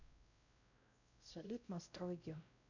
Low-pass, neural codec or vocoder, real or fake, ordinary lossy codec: 7.2 kHz; codec, 16 kHz, 0.5 kbps, X-Codec, WavLM features, trained on Multilingual LibriSpeech; fake; none